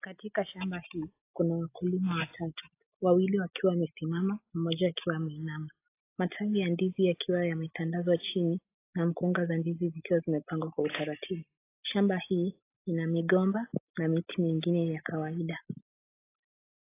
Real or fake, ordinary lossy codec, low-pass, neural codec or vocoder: real; AAC, 24 kbps; 3.6 kHz; none